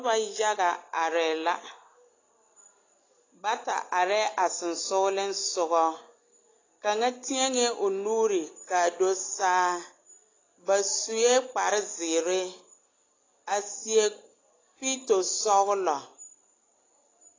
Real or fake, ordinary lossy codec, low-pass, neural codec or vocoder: real; AAC, 32 kbps; 7.2 kHz; none